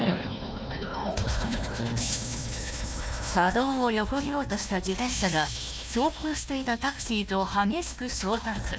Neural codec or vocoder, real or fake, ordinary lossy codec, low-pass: codec, 16 kHz, 1 kbps, FunCodec, trained on Chinese and English, 50 frames a second; fake; none; none